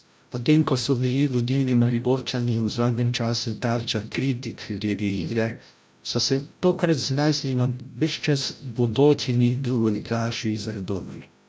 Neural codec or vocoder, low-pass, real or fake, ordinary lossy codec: codec, 16 kHz, 0.5 kbps, FreqCodec, larger model; none; fake; none